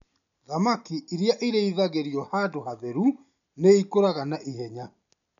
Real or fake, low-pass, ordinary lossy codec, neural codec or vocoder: real; 7.2 kHz; none; none